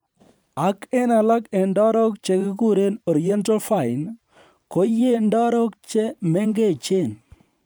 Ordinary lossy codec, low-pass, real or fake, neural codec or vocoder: none; none; fake; vocoder, 44.1 kHz, 128 mel bands every 512 samples, BigVGAN v2